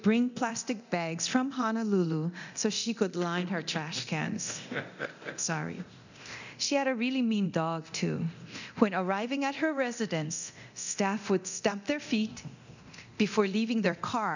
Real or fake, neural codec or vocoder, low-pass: fake; codec, 24 kHz, 0.9 kbps, DualCodec; 7.2 kHz